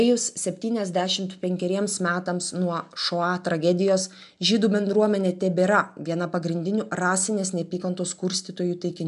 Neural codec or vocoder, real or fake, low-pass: none; real; 10.8 kHz